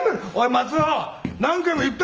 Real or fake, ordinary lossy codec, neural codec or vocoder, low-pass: real; Opus, 24 kbps; none; 7.2 kHz